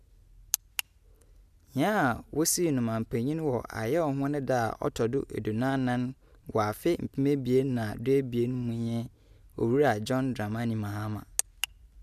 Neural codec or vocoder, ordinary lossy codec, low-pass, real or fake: none; none; 14.4 kHz; real